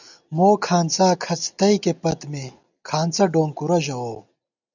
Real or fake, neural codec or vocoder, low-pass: real; none; 7.2 kHz